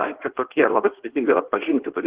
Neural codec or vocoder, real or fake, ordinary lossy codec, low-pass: codec, 16 kHz in and 24 kHz out, 1.1 kbps, FireRedTTS-2 codec; fake; Opus, 16 kbps; 3.6 kHz